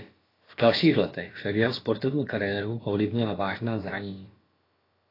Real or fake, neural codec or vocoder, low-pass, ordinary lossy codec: fake; codec, 16 kHz, about 1 kbps, DyCAST, with the encoder's durations; 5.4 kHz; AAC, 24 kbps